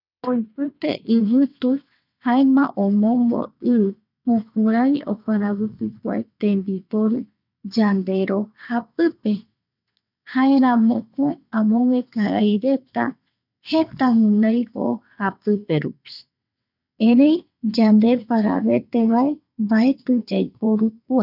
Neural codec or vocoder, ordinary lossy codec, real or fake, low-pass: vocoder, 22.05 kHz, 80 mel bands, WaveNeXt; none; fake; 5.4 kHz